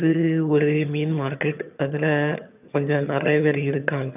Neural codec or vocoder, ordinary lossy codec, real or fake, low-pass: vocoder, 22.05 kHz, 80 mel bands, HiFi-GAN; none; fake; 3.6 kHz